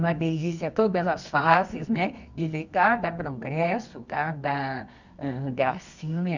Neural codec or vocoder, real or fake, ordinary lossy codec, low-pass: codec, 24 kHz, 0.9 kbps, WavTokenizer, medium music audio release; fake; none; 7.2 kHz